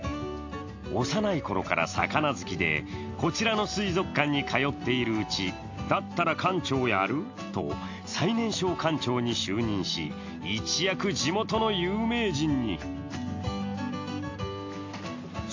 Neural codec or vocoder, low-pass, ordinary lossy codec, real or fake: none; 7.2 kHz; AAC, 48 kbps; real